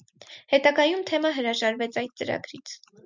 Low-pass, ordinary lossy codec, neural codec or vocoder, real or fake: 7.2 kHz; MP3, 64 kbps; none; real